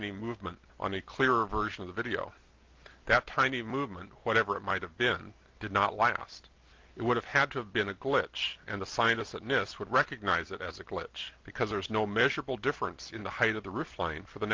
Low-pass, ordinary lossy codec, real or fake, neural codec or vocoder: 7.2 kHz; Opus, 24 kbps; real; none